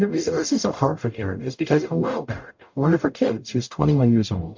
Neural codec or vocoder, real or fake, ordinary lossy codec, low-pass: codec, 44.1 kHz, 0.9 kbps, DAC; fake; MP3, 48 kbps; 7.2 kHz